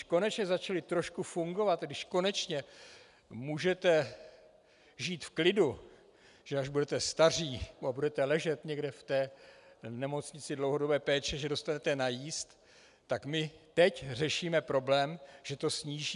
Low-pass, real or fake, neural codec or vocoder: 10.8 kHz; real; none